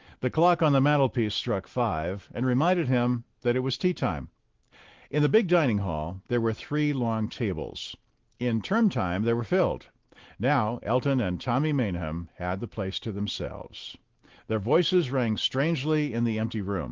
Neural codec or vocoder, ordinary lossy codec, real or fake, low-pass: none; Opus, 16 kbps; real; 7.2 kHz